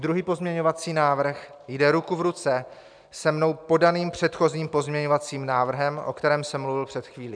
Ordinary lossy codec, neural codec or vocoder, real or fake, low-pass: MP3, 96 kbps; none; real; 9.9 kHz